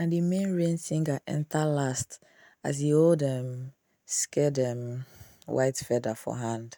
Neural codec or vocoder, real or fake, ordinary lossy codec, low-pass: none; real; none; none